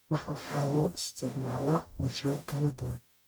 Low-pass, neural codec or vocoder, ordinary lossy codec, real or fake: none; codec, 44.1 kHz, 0.9 kbps, DAC; none; fake